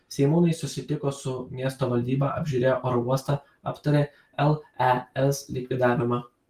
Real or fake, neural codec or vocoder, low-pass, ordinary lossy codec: real; none; 14.4 kHz; Opus, 24 kbps